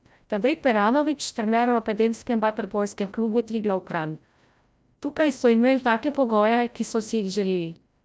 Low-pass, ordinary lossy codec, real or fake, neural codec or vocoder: none; none; fake; codec, 16 kHz, 0.5 kbps, FreqCodec, larger model